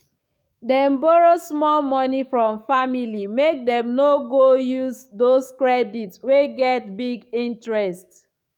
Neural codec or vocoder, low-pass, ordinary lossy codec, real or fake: codec, 44.1 kHz, 7.8 kbps, DAC; 19.8 kHz; none; fake